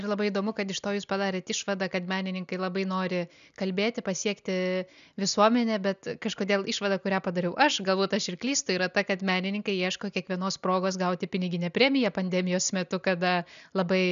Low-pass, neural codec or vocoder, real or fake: 7.2 kHz; none; real